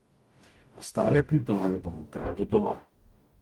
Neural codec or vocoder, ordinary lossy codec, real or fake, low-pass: codec, 44.1 kHz, 0.9 kbps, DAC; Opus, 24 kbps; fake; 19.8 kHz